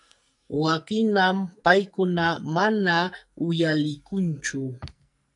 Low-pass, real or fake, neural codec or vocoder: 10.8 kHz; fake; codec, 44.1 kHz, 2.6 kbps, SNAC